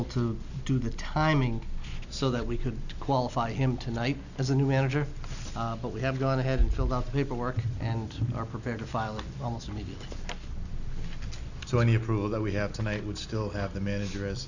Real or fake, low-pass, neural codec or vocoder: real; 7.2 kHz; none